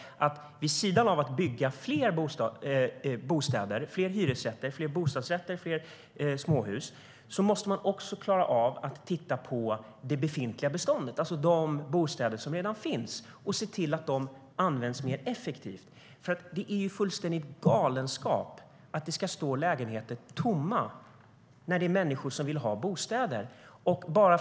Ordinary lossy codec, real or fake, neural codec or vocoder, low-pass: none; real; none; none